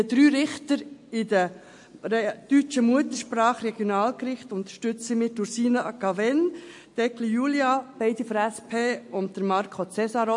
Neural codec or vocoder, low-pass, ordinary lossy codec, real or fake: none; 10.8 kHz; MP3, 48 kbps; real